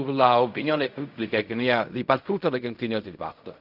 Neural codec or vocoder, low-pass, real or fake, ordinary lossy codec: codec, 16 kHz in and 24 kHz out, 0.4 kbps, LongCat-Audio-Codec, fine tuned four codebook decoder; 5.4 kHz; fake; none